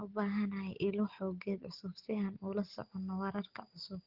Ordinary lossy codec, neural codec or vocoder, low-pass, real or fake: Opus, 16 kbps; none; 5.4 kHz; real